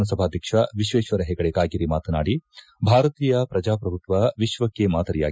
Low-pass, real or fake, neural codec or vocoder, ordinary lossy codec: none; real; none; none